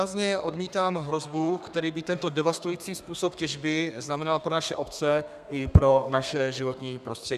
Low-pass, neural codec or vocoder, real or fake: 14.4 kHz; codec, 32 kHz, 1.9 kbps, SNAC; fake